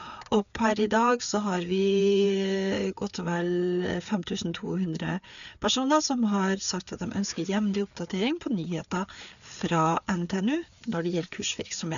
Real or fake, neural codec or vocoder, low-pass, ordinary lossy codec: fake; codec, 16 kHz, 4 kbps, FreqCodec, larger model; 7.2 kHz; none